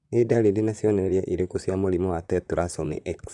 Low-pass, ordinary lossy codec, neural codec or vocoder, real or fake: 10.8 kHz; none; vocoder, 44.1 kHz, 128 mel bands, Pupu-Vocoder; fake